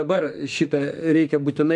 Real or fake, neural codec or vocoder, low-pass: fake; autoencoder, 48 kHz, 32 numbers a frame, DAC-VAE, trained on Japanese speech; 10.8 kHz